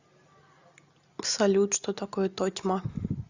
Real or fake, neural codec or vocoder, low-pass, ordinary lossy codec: real; none; 7.2 kHz; Opus, 64 kbps